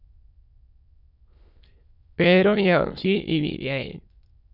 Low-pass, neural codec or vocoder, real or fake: 5.4 kHz; autoencoder, 22.05 kHz, a latent of 192 numbers a frame, VITS, trained on many speakers; fake